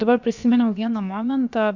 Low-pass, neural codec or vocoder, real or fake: 7.2 kHz; codec, 16 kHz, about 1 kbps, DyCAST, with the encoder's durations; fake